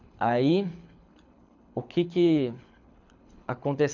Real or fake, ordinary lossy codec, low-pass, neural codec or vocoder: fake; none; 7.2 kHz; codec, 24 kHz, 6 kbps, HILCodec